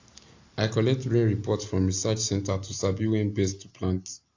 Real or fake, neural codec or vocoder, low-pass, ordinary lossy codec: real; none; 7.2 kHz; AAC, 48 kbps